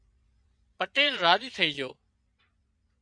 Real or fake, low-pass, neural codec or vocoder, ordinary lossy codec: fake; 9.9 kHz; vocoder, 22.05 kHz, 80 mel bands, Vocos; MP3, 64 kbps